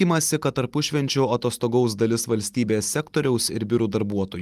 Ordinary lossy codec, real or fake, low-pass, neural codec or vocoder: Opus, 32 kbps; real; 14.4 kHz; none